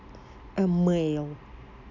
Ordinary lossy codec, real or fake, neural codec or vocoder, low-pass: none; real; none; 7.2 kHz